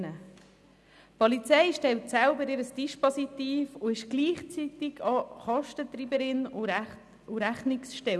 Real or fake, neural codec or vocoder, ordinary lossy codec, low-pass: real; none; none; none